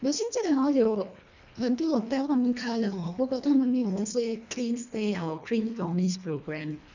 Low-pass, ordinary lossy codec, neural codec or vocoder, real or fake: 7.2 kHz; none; codec, 24 kHz, 1.5 kbps, HILCodec; fake